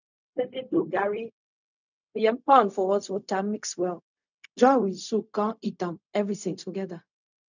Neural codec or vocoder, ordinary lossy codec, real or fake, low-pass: codec, 16 kHz, 0.4 kbps, LongCat-Audio-Codec; none; fake; 7.2 kHz